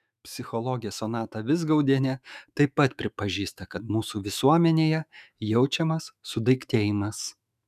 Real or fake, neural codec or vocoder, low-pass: fake; autoencoder, 48 kHz, 128 numbers a frame, DAC-VAE, trained on Japanese speech; 14.4 kHz